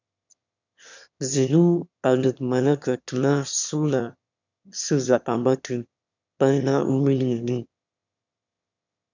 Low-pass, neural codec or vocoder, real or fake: 7.2 kHz; autoencoder, 22.05 kHz, a latent of 192 numbers a frame, VITS, trained on one speaker; fake